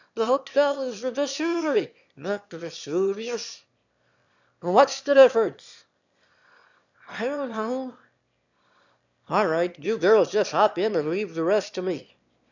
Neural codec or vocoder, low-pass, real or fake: autoencoder, 22.05 kHz, a latent of 192 numbers a frame, VITS, trained on one speaker; 7.2 kHz; fake